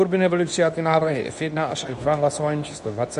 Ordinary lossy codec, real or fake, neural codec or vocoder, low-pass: MP3, 96 kbps; fake; codec, 24 kHz, 0.9 kbps, WavTokenizer, medium speech release version 2; 10.8 kHz